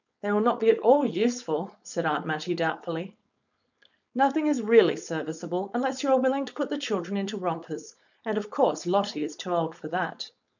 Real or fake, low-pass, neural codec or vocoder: fake; 7.2 kHz; codec, 16 kHz, 4.8 kbps, FACodec